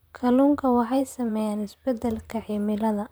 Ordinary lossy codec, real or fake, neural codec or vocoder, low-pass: none; fake; vocoder, 44.1 kHz, 128 mel bands, Pupu-Vocoder; none